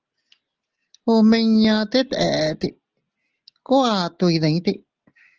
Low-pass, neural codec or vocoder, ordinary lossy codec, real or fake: 7.2 kHz; none; Opus, 16 kbps; real